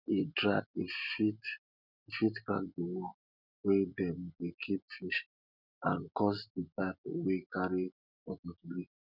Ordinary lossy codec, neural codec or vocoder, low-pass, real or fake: none; none; 5.4 kHz; real